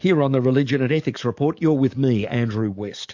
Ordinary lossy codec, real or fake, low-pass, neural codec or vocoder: MP3, 48 kbps; fake; 7.2 kHz; codec, 44.1 kHz, 7.8 kbps, DAC